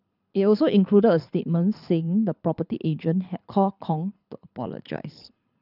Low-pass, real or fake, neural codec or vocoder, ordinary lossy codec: 5.4 kHz; fake; codec, 24 kHz, 6 kbps, HILCodec; none